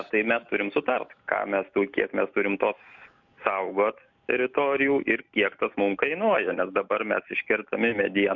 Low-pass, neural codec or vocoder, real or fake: 7.2 kHz; none; real